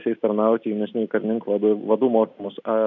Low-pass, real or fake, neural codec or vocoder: 7.2 kHz; real; none